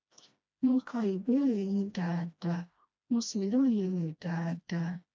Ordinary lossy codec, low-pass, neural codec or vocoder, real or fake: none; none; codec, 16 kHz, 1 kbps, FreqCodec, smaller model; fake